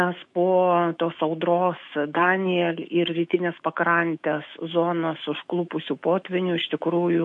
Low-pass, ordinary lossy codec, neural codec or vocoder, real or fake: 10.8 kHz; MP3, 48 kbps; autoencoder, 48 kHz, 128 numbers a frame, DAC-VAE, trained on Japanese speech; fake